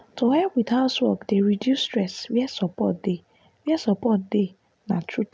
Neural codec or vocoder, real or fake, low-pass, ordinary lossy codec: none; real; none; none